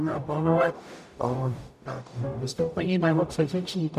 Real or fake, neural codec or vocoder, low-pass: fake; codec, 44.1 kHz, 0.9 kbps, DAC; 14.4 kHz